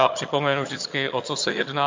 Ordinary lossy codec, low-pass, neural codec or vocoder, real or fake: MP3, 48 kbps; 7.2 kHz; vocoder, 22.05 kHz, 80 mel bands, HiFi-GAN; fake